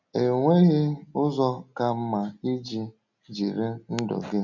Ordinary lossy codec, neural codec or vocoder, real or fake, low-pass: none; none; real; 7.2 kHz